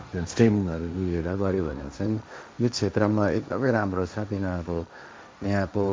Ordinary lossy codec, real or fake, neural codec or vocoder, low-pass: none; fake; codec, 16 kHz, 1.1 kbps, Voila-Tokenizer; none